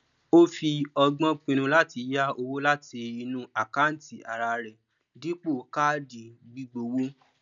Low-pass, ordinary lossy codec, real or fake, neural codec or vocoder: 7.2 kHz; none; real; none